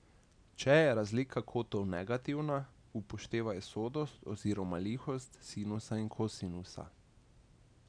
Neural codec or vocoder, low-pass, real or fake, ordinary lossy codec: none; 9.9 kHz; real; none